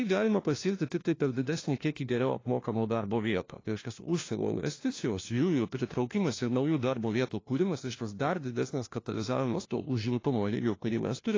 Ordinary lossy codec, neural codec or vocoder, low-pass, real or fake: AAC, 32 kbps; codec, 16 kHz, 1 kbps, FunCodec, trained on LibriTTS, 50 frames a second; 7.2 kHz; fake